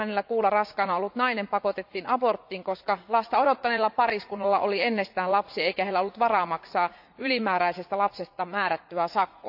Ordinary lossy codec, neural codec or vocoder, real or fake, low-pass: AAC, 48 kbps; vocoder, 44.1 kHz, 80 mel bands, Vocos; fake; 5.4 kHz